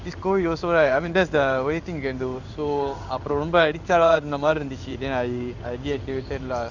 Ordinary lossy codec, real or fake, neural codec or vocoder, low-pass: none; fake; codec, 16 kHz in and 24 kHz out, 1 kbps, XY-Tokenizer; 7.2 kHz